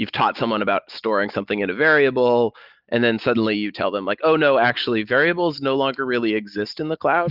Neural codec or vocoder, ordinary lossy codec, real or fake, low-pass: none; Opus, 32 kbps; real; 5.4 kHz